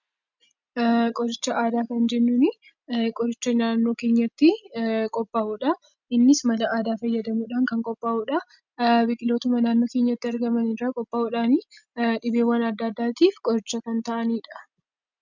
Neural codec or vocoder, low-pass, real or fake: none; 7.2 kHz; real